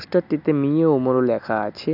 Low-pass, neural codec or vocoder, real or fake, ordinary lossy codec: 5.4 kHz; none; real; none